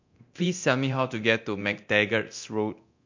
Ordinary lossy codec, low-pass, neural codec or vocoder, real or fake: MP3, 48 kbps; 7.2 kHz; codec, 24 kHz, 0.9 kbps, DualCodec; fake